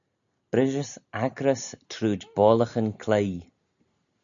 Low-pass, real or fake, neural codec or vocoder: 7.2 kHz; real; none